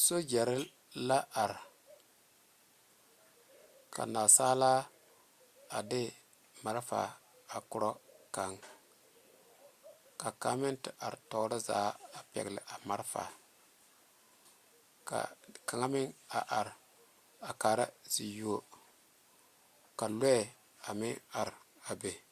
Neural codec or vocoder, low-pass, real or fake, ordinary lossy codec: none; 14.4 kHz; real; Opus, 64 kbps